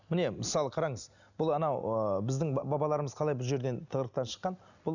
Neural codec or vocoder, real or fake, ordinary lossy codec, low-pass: none; real; none; 7.2 kHz